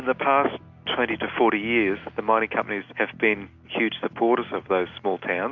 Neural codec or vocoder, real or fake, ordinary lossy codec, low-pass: none; real; MP3, 64 kbps; 7.2 kHz